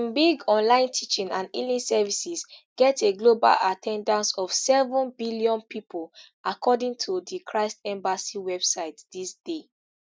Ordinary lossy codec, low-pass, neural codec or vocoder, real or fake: none; none; none; real